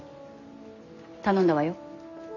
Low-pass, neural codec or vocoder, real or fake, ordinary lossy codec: 7.2 kHz; none; real; none